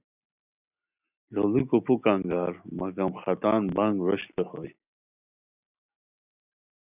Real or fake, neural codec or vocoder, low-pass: real; none; 3.6 kHz